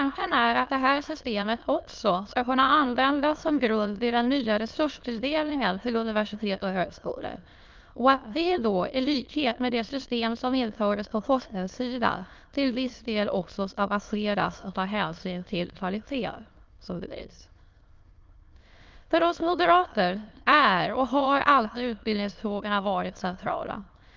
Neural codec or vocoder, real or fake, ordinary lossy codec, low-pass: autoencoder, 22.05 kHz, a latent of 192 numbers a frame, VITS, trained on many speakers; fake; Opus, 32 kbps; 7.2 kHz